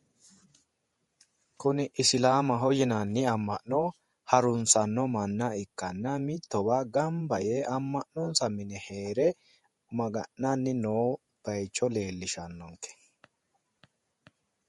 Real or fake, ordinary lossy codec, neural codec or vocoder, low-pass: fake; MP3, 48 kbps; vocoder, 48 kHz, 128 mel bands, Vocos; 19.8 kHz